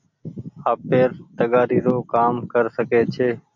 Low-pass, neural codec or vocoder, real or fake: 7.2 kHz; none; real